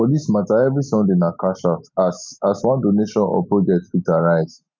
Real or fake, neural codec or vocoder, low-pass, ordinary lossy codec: real; none; none; none